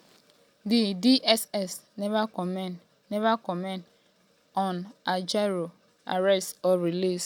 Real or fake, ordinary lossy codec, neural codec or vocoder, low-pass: real; none; none; 19.8 kHz